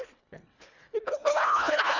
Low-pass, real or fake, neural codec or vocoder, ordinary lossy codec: 7.2 kHz; fake; codec, 24 kHz, 1.5 kbps, HILCodec; none